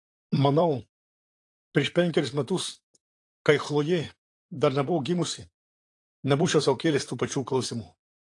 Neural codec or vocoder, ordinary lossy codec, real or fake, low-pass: vocoder, 44.1 kHz, 128 mel bands, Pupu-Vocoder; AAC, 48 kbps; fake; 10.8 kHz